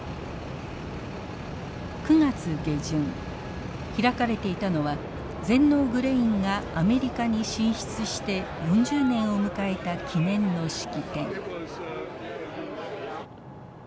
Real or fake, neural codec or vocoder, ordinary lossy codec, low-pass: real; none; none; none